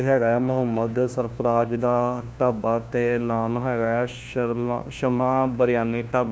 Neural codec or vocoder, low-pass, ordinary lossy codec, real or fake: codec, 16 kHz, 1 kbps, FunCodec, trained on LibriTTS, 50 frames a second; none; none; fake